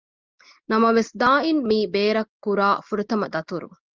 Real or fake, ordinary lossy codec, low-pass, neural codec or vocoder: real; Opus, 32 kbps; 7.2 kHz; none